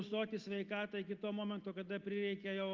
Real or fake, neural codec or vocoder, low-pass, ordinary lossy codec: real; none; 7.2 kHz; Opus, 32 kbps